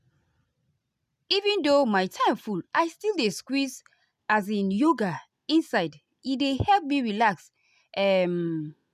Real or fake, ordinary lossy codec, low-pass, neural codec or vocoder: real; none; 14.4 kHz; none